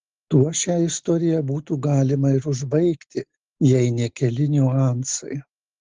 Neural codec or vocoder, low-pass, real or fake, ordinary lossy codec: none; 7.2 kHz; real; Opus, 16 kbps